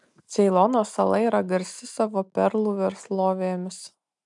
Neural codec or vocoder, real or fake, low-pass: none; real; 10.8 kHz